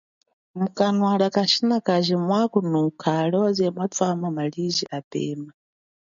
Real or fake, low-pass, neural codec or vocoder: real; 7.2 kHz; none